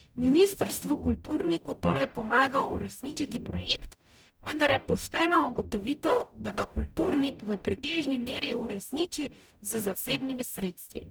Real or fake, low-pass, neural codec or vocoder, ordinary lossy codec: fake; none; codec, 44.1 kHz, 0.9 kbps, DAC; none